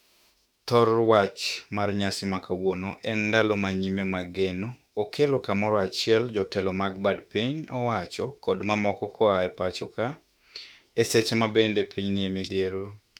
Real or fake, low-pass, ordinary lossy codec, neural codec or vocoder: fake; 19.8 kHz; none; autoencoder, 48 kHz, 32 numbers a frame, DAC-VAE, trained on Japanese speech